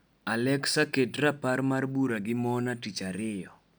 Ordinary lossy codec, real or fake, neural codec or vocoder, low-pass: none; real; none; none